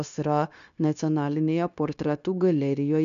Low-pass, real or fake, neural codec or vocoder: 7.2 kHz; fake; codec, 16 kHz, 0.9 kbps, LongCat-Audio-Codec